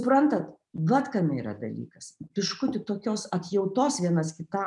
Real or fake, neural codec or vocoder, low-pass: real; none; 10.8 kHz